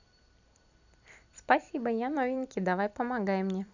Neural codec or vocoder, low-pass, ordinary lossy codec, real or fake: vocoder, 44.1 kHz, 128 mel bands every 256 samples, BigVGAN v2; 7.2 kHz; none; fake